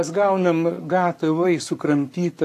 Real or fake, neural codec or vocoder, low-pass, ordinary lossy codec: fake; codec, 44.1 kHz, 7.8 kbps, Pupu-Codec; 14.4 kHz; AAC, 64 kbps